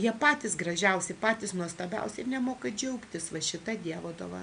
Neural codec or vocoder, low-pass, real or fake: none; 9.9 kHz; real